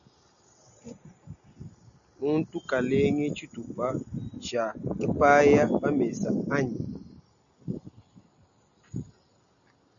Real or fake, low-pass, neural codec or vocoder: real; 7.2 kHz; none